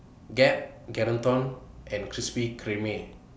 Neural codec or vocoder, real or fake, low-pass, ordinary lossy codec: none; real; none; none